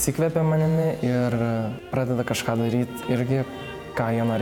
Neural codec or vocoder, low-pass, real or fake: vocoder, 48 kHz, 128 mel bands, Vocos; 19.8 kHz; fake